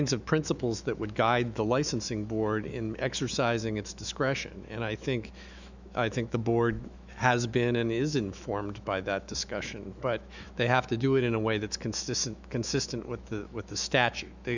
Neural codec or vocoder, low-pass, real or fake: autoencoder, 48 kHz, 128 numbers a frame, DAC-VAE, trained on Japanese speech; 7.2 kHz; fake